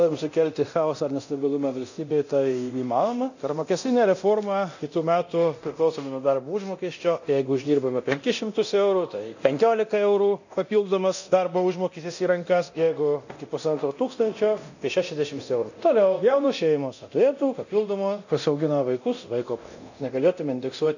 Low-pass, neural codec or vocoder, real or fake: 7.2 kHz; codec, 24 kHz, 0.9 kbps, DualCodec; fake